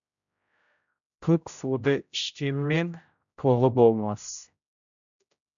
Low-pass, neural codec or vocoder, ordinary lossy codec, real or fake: 7.2 kHz; codec, 16 kHz, 0.5 kbps, X-Codec, HuBERT features, trained on general audio; MP3, 64 kbps; fake